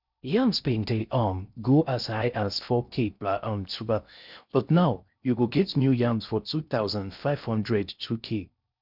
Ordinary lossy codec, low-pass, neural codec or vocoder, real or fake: none; 5.4 kHz; codec, 16 kHz in and 24 kHz out, 0.6 kbps, FocalCodec, streaming, 4096 codes; fake